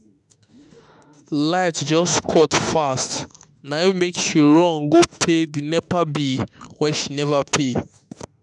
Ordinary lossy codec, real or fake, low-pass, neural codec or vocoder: none; fake; 10.8 kHz; autoencoder, 48 kHz, 32 numbers a frame, DAC-VAE, trained on Japanese speech